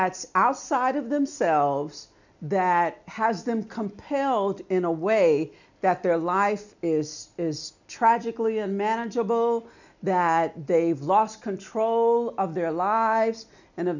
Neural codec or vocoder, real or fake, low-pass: none; real; 7.2 kHz